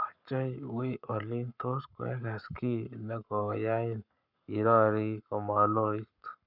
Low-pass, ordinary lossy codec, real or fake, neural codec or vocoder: 5.4 kHz; none; fake; codec, 44.1 kHz, 7.8 kbps, Pupu-Codec